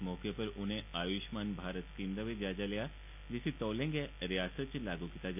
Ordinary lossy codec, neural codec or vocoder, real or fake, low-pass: none; none; real; 3.6 kHz